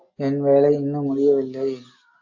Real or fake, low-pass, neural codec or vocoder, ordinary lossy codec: real; 7.2 kHz; none; AAC, 48 kbps